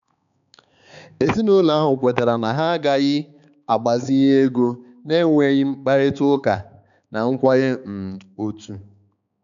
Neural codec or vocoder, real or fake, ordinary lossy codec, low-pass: codec, 16 kHz, 4 kbps, X-Codec, HuBERT features, trained on balanced general audio; fake; none; 7.2 kHz